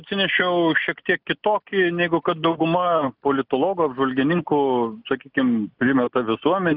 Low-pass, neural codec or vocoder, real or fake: 5.4 kHz; none; real